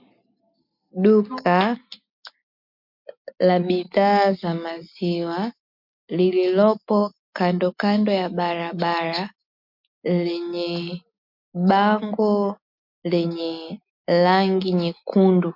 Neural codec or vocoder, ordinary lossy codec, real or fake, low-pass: none; MP3, 48 kbps; real; 5.4 kHz